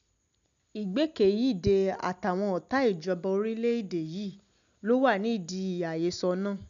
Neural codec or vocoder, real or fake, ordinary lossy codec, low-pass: none; real; none; 7.2 kHz